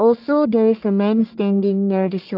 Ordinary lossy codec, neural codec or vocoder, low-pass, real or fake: Opus, 24 kbps; codec, 44.1 kHz, 1.7 kbps, Pupu-Codec; 5.4 kHz; fake